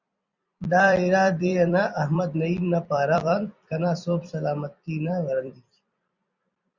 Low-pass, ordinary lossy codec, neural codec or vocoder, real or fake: 7.2 kHz; Opus, 64 kbps; vocoder, 44.1 kHz, 128 mel bands every 256 samples, BigVGAN v2; fake